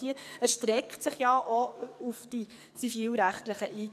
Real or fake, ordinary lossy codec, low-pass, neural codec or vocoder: fake; none; 14.4 kHz; codec, 44.1 kHz, 2.6 kbps, SNAC